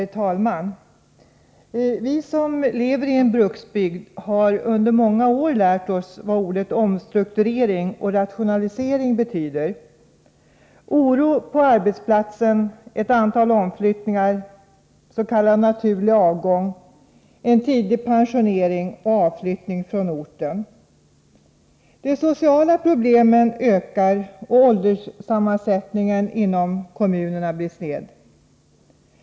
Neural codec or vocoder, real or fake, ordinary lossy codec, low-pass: none; real; none; none